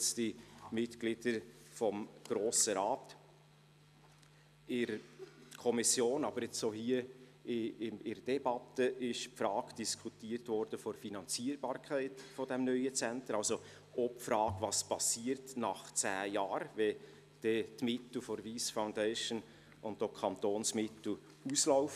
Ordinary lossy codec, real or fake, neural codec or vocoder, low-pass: none; real; none; 14.4 kHz